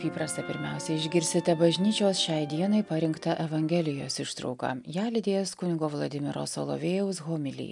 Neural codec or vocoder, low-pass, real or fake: none; 10.8 kHz; real